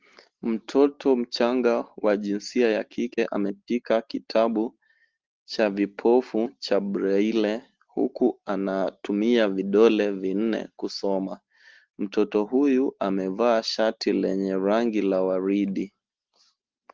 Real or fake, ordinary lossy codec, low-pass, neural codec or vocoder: real; Opus, 16 kbps; 7.2 kHz; none